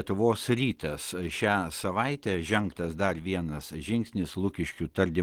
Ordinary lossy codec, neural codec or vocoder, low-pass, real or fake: Opus, 16 kbps; none; 19.8 kHz; real